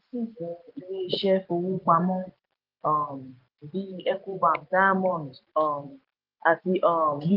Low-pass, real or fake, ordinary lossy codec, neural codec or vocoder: 5.4 kHz; real; Opus, 24 kbps; none